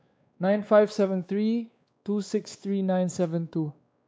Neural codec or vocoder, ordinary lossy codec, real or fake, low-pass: codec, 16 kHz, 2 kbps, X-Codec, WavLM features, trained on Multilingual LibriSpeech; none; fake; none